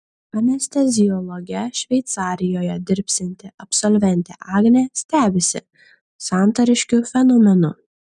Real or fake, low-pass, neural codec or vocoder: real; 10.8 kHz; none